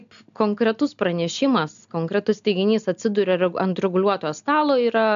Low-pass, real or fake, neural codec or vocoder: 7.2 kHz; real; none